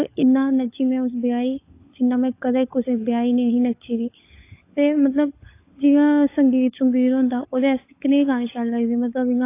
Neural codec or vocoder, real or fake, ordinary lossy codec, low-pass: codec, 16 kHz, 16 kbps, FunCodec, trained on LibriTTS, 50 frames a second; fake; AAC, 24 kbps; 3.6 kHz